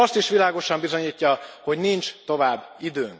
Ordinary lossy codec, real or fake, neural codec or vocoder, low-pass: none; real; none; none